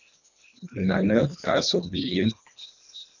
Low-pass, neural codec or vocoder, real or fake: 7.2 kHz; codec, 24 kHz, 1.5 kbps, HILCodec; fake